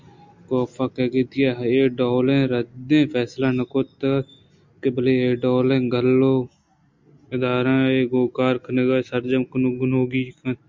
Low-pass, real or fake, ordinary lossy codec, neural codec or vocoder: 7.2 kHz; real; MP3, 64 kbps; none